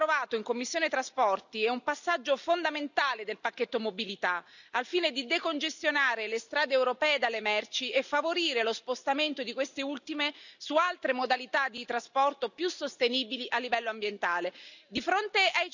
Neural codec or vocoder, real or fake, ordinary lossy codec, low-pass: none; real; none; 7.2 kHz